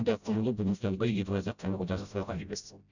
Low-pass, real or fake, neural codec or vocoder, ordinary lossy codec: 7.2 kHz; fake; codec, 16 kHz, 0.5 kbps, FreqCodec, smaller model; none